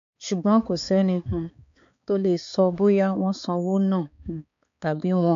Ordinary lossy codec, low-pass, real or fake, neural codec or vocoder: AAC, 64 kbps; 7.2 kHz; fake; codec, 16 kHz, 4 kbps, X-Codec, HuBERT features, trained on balanced general audio